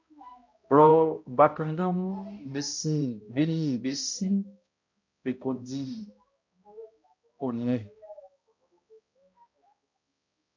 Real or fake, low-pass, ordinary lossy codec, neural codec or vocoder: fake; 7.2 kHz; MP3, 48 kbps; codec, 16 kHz, 0.5 kbps, X-Codec, HuBERT features, trained on balanced general audio